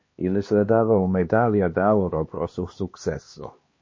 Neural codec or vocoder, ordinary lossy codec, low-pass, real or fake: codec, 16 kHz, 2 kbps, X-Codec, HuBERT features, trained on LibriSpeech; MP3, 32 kbps; 7.2 kHz; fake